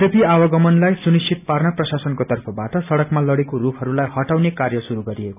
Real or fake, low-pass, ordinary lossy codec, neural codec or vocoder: real; 3.6 kHz; none; none